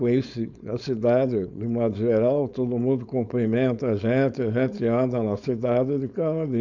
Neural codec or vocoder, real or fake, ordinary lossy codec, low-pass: codec, 16 kHz, 4.8 kbps, FACodec; fake; none; 7.2 kHz